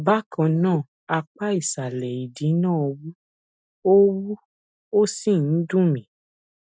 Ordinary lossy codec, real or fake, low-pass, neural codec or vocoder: none; real; none; none